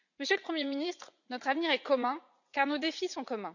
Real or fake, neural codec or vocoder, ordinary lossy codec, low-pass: fake; vocoder, 44.1 kHz, 80 mel bands, Vocos; none; 7.2 kHz